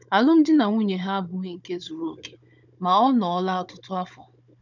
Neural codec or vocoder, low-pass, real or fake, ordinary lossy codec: codec, 16 kHz, 16 kbps, FunCodec, trained on Chinese and English, 50 frames a second; 7.2 kHz; fake; none